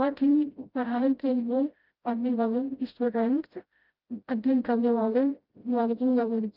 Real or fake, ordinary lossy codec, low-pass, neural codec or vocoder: fake; Opus, 24 kbps; 5.4 kHz; codec, 16 kHz, 0.5 kbps, FreqCodec, smaller model